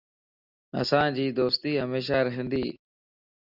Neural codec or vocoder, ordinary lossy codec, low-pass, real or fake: none; Opus, 64 kbps; 5.4 kHz; real